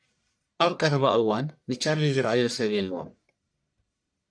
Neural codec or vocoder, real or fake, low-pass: codec, 44.1 kHz, 1.7 kbps, Pupu-Codec; fake; 9.9 kHz